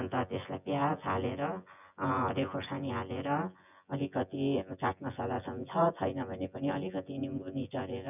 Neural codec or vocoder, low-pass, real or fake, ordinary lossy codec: vocoder, 24 kHz, 100 mel bands, Vocos; 3.6 kHz; fake; none